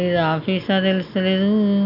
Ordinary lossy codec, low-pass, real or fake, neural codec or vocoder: none; 5.4 kHz; real; none